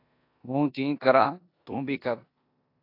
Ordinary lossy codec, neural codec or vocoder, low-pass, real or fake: AAC, 32 kbps; codec, 16 kHz in and 24 kHz out, 0.9 kbps, LongCat-Audio-Codec, four codebook decoder; 5.4 kHz; fake